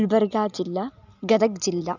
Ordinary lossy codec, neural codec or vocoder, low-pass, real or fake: none; none; 7.2 kHz; real